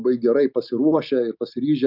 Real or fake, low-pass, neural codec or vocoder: real; 5.4 kHz; none